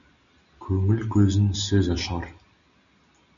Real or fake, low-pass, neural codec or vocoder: real; 7.2 kHz; none